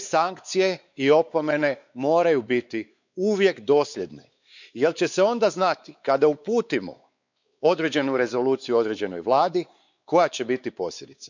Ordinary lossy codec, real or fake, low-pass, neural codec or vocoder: none; fake; 7.2 kHz; codec, 16 kHz, 4 kbps, X-Codec, WavLM features, trained on Multilingual LibriSpeech